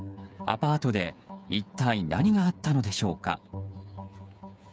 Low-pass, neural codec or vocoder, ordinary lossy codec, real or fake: none; codec, 16 kHz, 8 kbps, FreqCodec, smaller model; none; fake